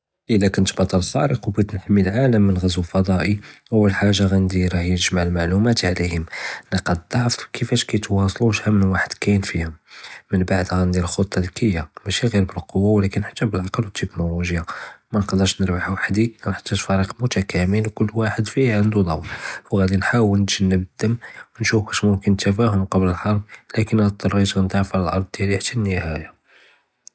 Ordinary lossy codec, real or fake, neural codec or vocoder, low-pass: none; real; none; none